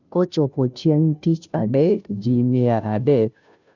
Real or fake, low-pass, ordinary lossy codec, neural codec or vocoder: fake; 7.2 kHz; none; codec, 16 kHz, 0.5 kbps, FunCodec, trained on Chinese and English, 25 frames a second